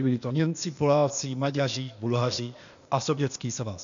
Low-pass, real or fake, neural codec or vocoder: 7.2 kHz; fake; codec, 16 kHz, 0.8 kbps, ZipCodec